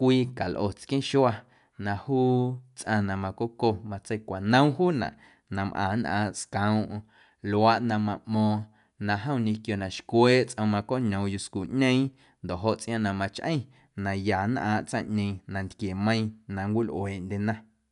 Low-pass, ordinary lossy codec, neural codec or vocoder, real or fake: 10.8 kHz; none; none; real